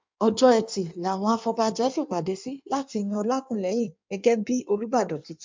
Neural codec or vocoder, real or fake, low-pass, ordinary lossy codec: codec, 16 kHz in and 24 kHz out, 1.1 kbps, FireRedTTS-2 codec; fake; 7.2 kHz; MP3, 64 kbps